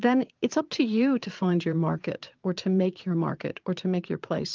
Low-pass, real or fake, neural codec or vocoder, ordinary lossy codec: 7.2 kHz; fake; vocoder, 22.05 kHz, 80 mel bands, Vocos; Opus, 32 kbps